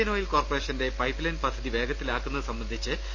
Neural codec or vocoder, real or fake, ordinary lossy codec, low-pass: none; real; none; 7.2 kHz